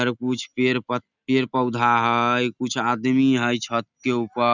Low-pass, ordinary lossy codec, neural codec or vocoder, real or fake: 7.2 kHz; none; none; real